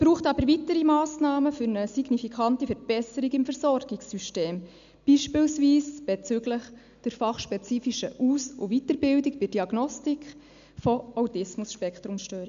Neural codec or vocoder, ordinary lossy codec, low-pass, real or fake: none; MP3, 96 kbps; 7.2 kHz; real